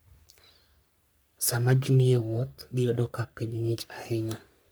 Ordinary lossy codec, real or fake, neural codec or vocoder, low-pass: none; fake; codec, 44.1 kHz, 3.4 kbps, Pupu-Codec; none